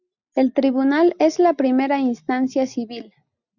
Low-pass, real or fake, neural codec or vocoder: 7.2 kHz; real; none